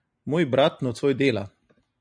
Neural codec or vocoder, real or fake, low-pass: none; real; 9.9 kHz